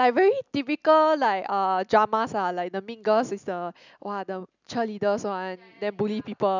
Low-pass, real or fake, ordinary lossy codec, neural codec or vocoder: 7.2 kHz; real; none; none